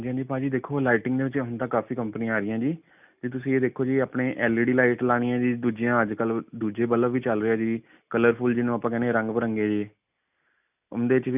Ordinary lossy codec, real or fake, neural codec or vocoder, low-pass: none; real; none; 3.6 kHz